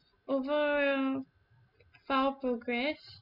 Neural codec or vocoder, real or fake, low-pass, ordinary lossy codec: none; real; 5.4 kHz; MP3, 48 kbps